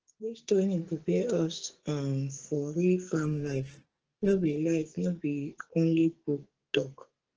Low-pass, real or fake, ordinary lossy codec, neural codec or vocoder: 7.2 kHz; fake; Opus, 16 kbps; codec, 44.1 kHz, 2.6 kbps, SNAC